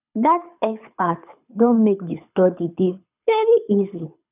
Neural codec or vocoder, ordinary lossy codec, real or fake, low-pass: codec, 24 kHz, 6 kbps, HILCodec; none; fake; 3.6 kHz